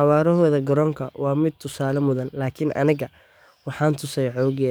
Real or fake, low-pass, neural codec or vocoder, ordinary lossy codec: fake; none; codec, 44.1 kHz, 7.8 kbps, DAC; none